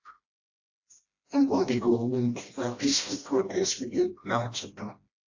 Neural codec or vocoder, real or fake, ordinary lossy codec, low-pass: codec, 16 kHz, 1 kbps, FreqCodec, smaller model; fake; AAC, 48 kbps; 7.2 kHz